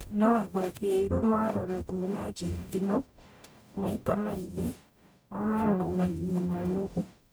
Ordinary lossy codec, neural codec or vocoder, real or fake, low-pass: none; codec, 44.1 kHz, 0.9 kbps, DAC; fake; none